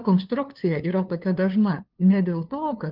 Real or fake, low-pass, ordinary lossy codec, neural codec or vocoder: fake; 5.4 kHz; Opus, 32 kbps; codec, 16 kHz, 2 kbps, FunCodec, trained on LibriTTS, 25 frames a second